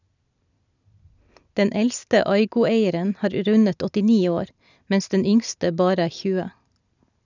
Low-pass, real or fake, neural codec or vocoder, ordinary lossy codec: 7.2 kHz; real; none; none